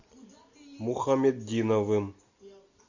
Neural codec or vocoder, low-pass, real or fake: none; 7.2 kHz; real